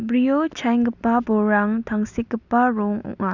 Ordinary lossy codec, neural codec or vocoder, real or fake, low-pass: none; none; real; 7.2 kHz